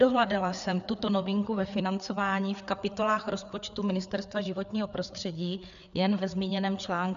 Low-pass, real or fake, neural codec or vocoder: 7.2 kHz; fake; codec, 16 kHz, 4 kbps, FreqCodec, larger model